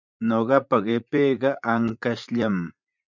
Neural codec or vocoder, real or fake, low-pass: vocoder, 44.1 kHz, 80 mel bands, Vocos; fake; 7.2 kHz